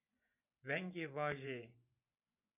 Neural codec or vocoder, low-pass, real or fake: vocoder, 24 kHz, 100 mel bands, Vocos; 3.6 kHz; fake